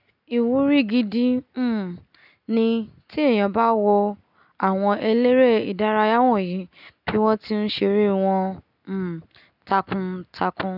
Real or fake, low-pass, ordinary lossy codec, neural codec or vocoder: real; 5.4 kHz; none; none